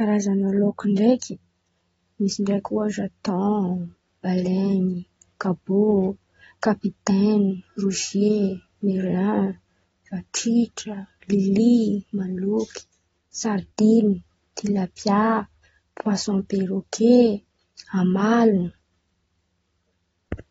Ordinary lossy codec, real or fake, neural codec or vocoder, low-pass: AAC, 24 kbps; real; none; 19.8 kHz